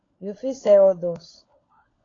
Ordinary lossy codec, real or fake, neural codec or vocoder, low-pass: AAC, 32 kbps; fake; codec, 16 kHz, 16 kbps, FunCodec, trained on LibriTTS, 50 frames a second; 7.2 kHz